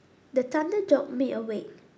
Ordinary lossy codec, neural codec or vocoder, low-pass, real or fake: none; none; none; real